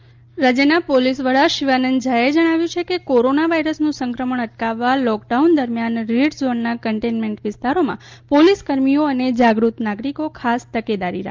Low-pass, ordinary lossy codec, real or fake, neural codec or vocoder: 7.2 kHz; Opus, 32 kbps; real; none